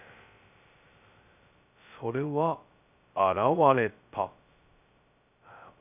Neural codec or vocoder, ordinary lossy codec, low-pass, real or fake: codec, 16 kHz, 0.2 kbps, FocalCodec; none; 3.6 kHz; fake